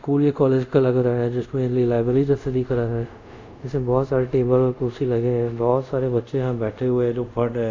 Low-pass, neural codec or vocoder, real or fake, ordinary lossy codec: 7.2 kHz; codec, 24 kHz, 0.5 kbps, DualCodec; fake; MP3, 64 kbps